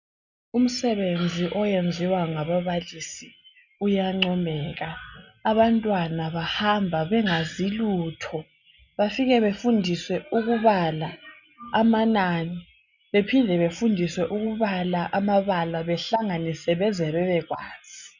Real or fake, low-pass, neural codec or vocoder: real; 7.2 kHz; none